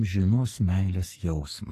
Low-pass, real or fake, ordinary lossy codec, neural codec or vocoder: 14.4 kHz; fake; AAC, 48 kbps; codec, 44.1 kHz, 2.6 kbps, SNAC